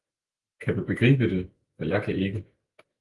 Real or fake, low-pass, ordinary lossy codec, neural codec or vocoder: fake; 10.8 kHz; Opus, 24 kbps; autoencoder, 48 kHz, 128 numbers a frame, DAC-VAE, trained on Japanese speech